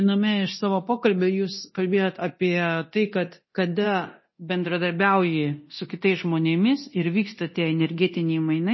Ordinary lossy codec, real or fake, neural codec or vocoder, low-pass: MP3, 24 kbps; fake; codec, 24 kHz, 0.9 kbps, DualCodec; 7.2 kHz